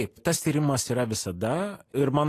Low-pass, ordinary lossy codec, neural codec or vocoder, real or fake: 14.4 kHz; AAC, 48 kbps; none; real